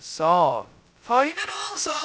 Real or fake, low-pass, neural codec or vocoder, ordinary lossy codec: fake; none; codec, 16 kHz, 0.2 kbps, FocalCodec; none